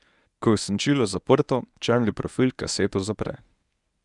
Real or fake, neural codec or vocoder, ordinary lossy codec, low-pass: fake; codec, 24 kHz, 0.9 kbps, WavTokenizer, medium speech release version 1; none; 10.8 kHz